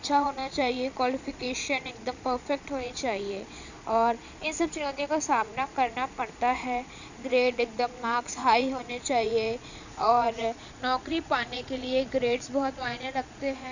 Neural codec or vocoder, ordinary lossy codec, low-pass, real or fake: vocoder, 22.05 kHz, 80 mel bands, Vocos; none; 7.2 kHz; fake